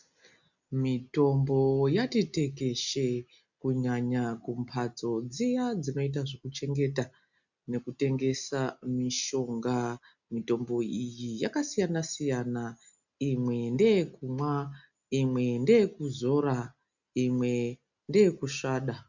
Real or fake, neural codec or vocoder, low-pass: real; none; 7.2 kHz